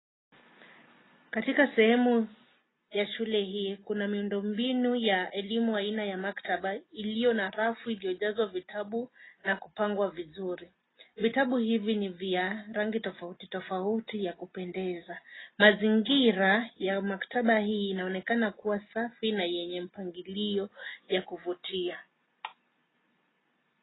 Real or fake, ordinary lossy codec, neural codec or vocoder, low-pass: real; AAC, 16 kbps; none; 7.2 kHz